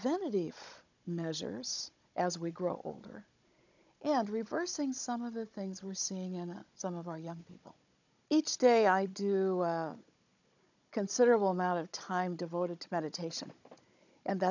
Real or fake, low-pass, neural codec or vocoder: fake; 7.2 kHz; codec, 16 kHz, 16 kbps, FunCodec, trained on Chinese and English, 50 frames a second